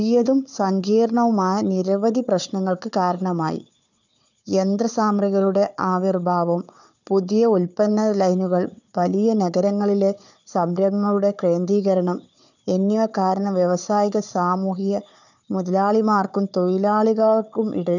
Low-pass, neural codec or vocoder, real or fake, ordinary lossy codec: 7.2 kHz; codec, 16 kHz, 4 kbps, FunCodec, trained on Chinese and English, 50 frames a second; fake; none